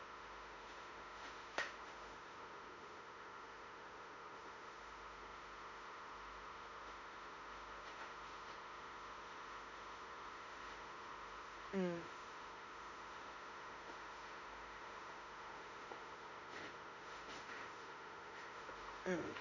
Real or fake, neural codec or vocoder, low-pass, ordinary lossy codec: fake; autoencoder, 48 kHz, 32 numbers a frame, DAC-VAE, trained on Japanese speech; 7.2 kHz; none